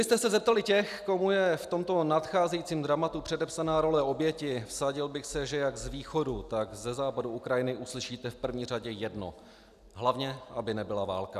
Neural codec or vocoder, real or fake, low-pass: none; real; 14.4 kHz